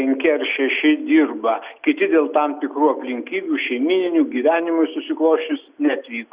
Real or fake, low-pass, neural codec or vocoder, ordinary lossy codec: real; 3.6 kHz; none; Opus, 64 kbps